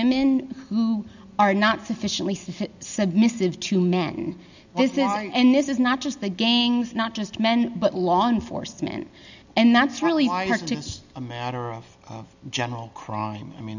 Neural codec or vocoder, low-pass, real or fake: none; 7.2 kHz; real